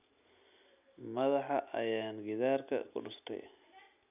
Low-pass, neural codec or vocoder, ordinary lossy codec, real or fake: 3.6 kHz; none; none; real